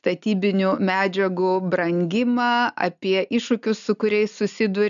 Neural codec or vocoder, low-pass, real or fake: none; 7.2 kHz; real